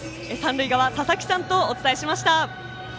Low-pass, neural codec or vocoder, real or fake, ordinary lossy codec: none; none; real; none